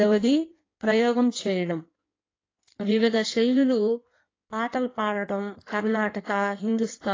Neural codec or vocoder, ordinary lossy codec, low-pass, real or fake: codec, 16 kHz in and 24 kHz out, 1.1 kbps, FireRedTTS-2 codec; AAC, 32 kbps; 7.2 kHz; fake